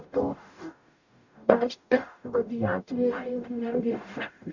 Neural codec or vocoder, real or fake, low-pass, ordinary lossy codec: codec, 44.1 kHz, 0.9 kbps, DAC; fake; 7.2 kHz; none